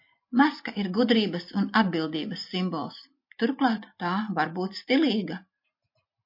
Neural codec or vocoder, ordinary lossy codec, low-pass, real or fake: none; MP3, 32 kbps; 5.4 kHz; real